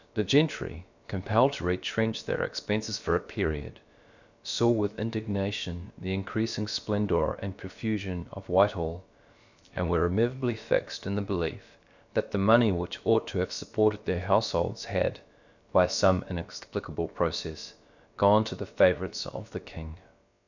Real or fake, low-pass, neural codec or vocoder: fake; 7.2 kHz; codec, 16 kHz, about 1 kbps, DyCAST, with the encoder's durations